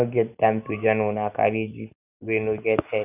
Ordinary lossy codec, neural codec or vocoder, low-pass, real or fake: none; none; 3.6 kHz; real